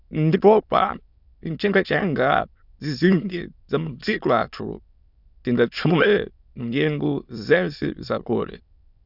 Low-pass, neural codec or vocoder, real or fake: 5.4 kHz; autoencoder, 22.05 kHz, a latent of 192 numbers a frame, VITS, trained on many speakers; fake